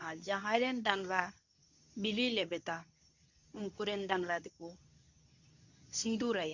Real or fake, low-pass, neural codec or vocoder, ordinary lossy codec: fake; 7.2 kHz; codec, 24 kHz, 0.9 kbps, WavTokenizer, medium speech release version 2; none